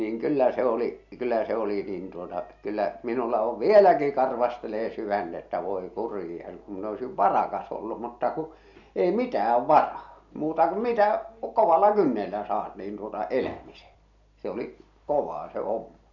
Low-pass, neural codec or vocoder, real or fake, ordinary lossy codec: 7.2 kHz; none; real; none